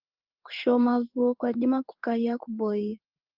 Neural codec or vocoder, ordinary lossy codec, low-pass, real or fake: codec, 16 kHz in and 24 kHz out, 1 kbps, XY-Tokenizer; Opus, 24 kbps; 5.4 kHz; fake